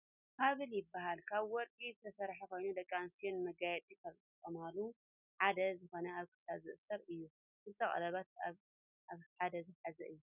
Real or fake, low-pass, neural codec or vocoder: real; 3.6 kHz; none